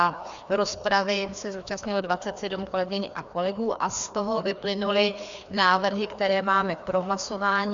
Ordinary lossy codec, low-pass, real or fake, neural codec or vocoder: Opus, 64 kbps; 7.2 kHz; fake; codec, 16 kHz, 2 kbps, FreqCodec, larger model